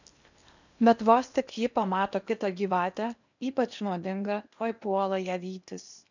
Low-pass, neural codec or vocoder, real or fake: 7.2 kHz; codec, 16 kHz in and 24 kHz out, 0.8 kbps, FocalCodec, streaming, 65536 codes; fake